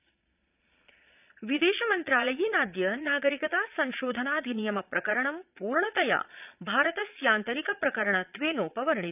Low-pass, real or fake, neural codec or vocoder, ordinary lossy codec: 3.6 kHz; fake; vocoder, 22.05 kHz, 80 mel bands, Vocos; none